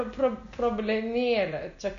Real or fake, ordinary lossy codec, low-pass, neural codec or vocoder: real; MP3, 64 kbps; 7.2 kHz; none